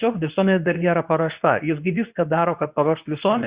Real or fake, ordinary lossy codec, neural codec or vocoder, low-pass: fake; Opus, 64 kbps; codec, 24 kHz, 0.9 kbps, WavTokenizer, medium speech release version 2; 3.6 kHz